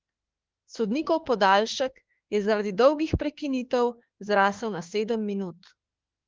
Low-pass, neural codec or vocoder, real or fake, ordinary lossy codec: 7.2 kHz; autoencoder, 48 kHz, 32 numbers a frame, DAC-VAE, trained on Japanese speech; fake; Opus, 16 kbps